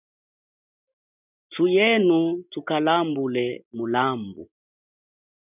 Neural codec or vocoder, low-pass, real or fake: none; 3.6 kHz; real